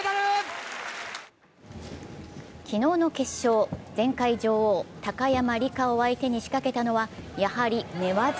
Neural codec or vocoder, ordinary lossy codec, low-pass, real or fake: none; none; none; real